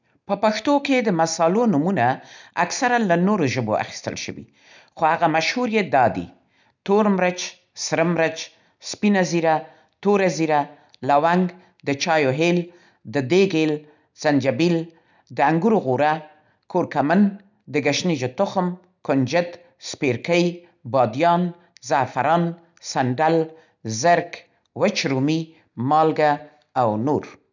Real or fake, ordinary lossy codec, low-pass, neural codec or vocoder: real; none; 7.2 kHz; none